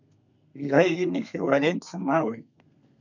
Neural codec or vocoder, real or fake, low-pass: codec, 32 kHz, 1.9 kbps, SNAC; fake; 7.2 kHz